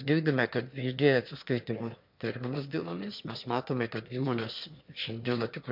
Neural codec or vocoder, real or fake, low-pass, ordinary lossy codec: autoencoder, 22.05 kHz, a latent of 192 numbers a frame, VITS, trained on one speaker; fake; 5.4 kHz; MP3, 48 kbps